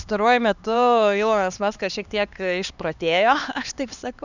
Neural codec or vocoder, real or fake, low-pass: codec, 16 kHz, 2 kbps, X-Codec, HuBERT features, trained on LibriSpeech; fake; 7.2 kHz